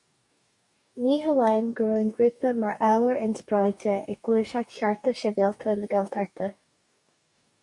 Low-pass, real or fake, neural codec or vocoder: 10.8 kHz; fake; codec, 44.1 kHz, 2.6 kbps, DAC